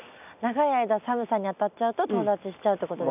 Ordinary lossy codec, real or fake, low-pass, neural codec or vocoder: none; real; 3.6 kHz; none